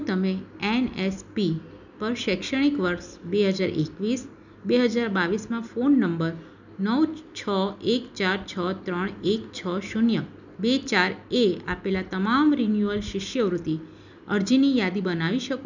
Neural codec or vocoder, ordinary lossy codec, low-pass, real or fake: none; none; 7.2 kHz; real